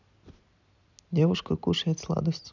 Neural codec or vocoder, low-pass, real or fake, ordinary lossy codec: none; 7.2 kHz; real; none